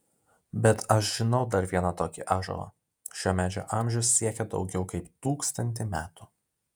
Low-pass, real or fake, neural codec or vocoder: 19.8 kHz; fake; vocoder, 48 kHz, 128 mel bands, Vocos